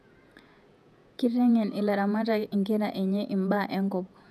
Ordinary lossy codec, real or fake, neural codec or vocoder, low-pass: none; fake; vocoder, 48 kHz, 128 mel bands, Vocos; 14.4 kHz